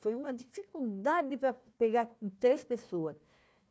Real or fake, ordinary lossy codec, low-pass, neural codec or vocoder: fake; none; none; codec, 16 kHz, 1 kbps, FunCodec, trained on Chinese and English, 50 frames a second